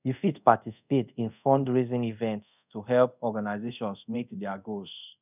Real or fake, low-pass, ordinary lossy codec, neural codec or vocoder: fake; 3.6 kHz; none; codec, 24 kHz, 0.5 kbps, DualCodec